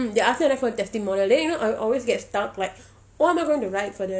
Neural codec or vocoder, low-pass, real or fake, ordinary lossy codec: none; none; real; none